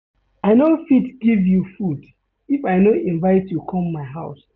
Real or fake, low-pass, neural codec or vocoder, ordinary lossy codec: real; 7.2 kHz; none; none